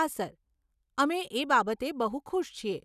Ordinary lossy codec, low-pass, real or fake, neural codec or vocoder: none; 14.4 kHz; real; none